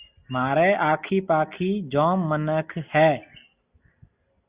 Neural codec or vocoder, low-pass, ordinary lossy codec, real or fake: none; 3.6 kHz; Opus, 64 kbps; real